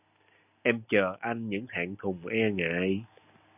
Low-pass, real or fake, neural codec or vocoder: 3.6 kHz; real; none